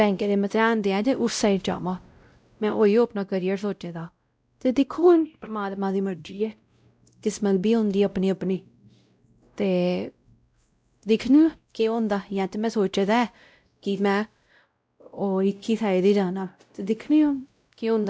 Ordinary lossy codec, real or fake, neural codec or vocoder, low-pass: none; fake; codec, 16 kHz, 0.5 kbps, X-Codec, WavLM features, trained on Multilingual LibriSpeech; none